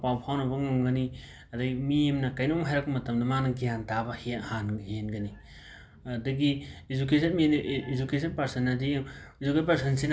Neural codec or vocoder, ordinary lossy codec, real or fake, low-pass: none; none; real; none